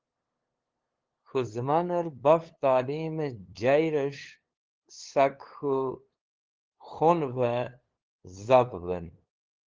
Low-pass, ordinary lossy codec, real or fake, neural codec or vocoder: 7.2 kHz; Opus, 16 kbps; fake; codec, 16 kHz, 2 kbps, FunCodec, trained on LibriTTS, 25 frames a second